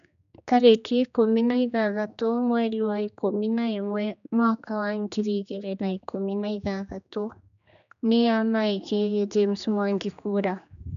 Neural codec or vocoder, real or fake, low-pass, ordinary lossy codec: codec, 16 kHz, 2 kbps, X-Codec, HuBERT features, trained on general audio; fake; 7.2 kHz; none